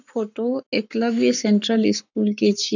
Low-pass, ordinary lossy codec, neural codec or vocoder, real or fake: 7.2 kHz; none; codec, 44.1 kHz, 7.8 kbps, Pupu-Codec; fake